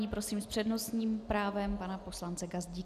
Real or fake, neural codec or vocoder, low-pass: real; none; 14.4 kHz